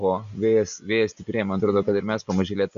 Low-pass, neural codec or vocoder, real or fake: 7.2 kHz; none; real